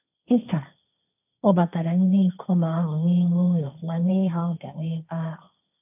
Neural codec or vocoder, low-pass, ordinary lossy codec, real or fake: codec, 16 kHz, 1.1 kbps, Voila-Tokenizer; 3.6 kHz; none; fake